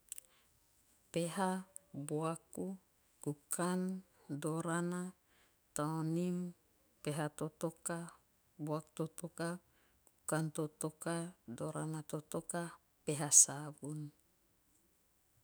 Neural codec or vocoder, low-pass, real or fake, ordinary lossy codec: autoencoder, 48 kHz, 128 numbers a frame, DAC-VAE, trained on Japanese speech; none; fake; none